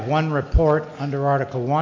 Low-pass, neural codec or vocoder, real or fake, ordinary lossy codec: 7.2 kHz; none; real; MP3, 64 kbps